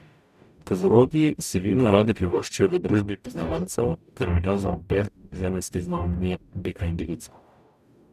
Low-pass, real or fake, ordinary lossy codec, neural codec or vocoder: 14.4 kHz; fake; none; codec, 44.1 kHz, 0.9 kbps, DAC